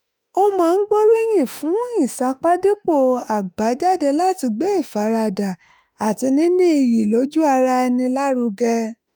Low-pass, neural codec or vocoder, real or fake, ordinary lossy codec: none; autoencoder, 48 kHz, 32 numbers a frame, DAC-VAE, trained on Japanese speech; fake; none